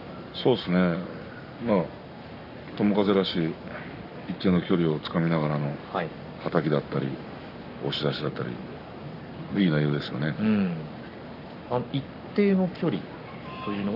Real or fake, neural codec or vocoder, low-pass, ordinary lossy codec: fake; codec, 44.1 kHz, 7.8 kbps, Pupu-Codec; 5.4 kHz; none